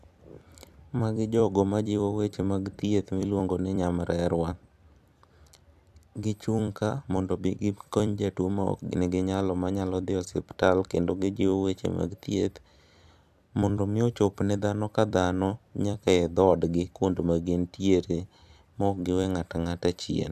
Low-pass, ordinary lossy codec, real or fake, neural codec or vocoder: 14.4 kHz; AAC, 96 kbps; fake; vocoder, 44.1 kHz, 128 mel bands every 256 samples, BigVGAN v2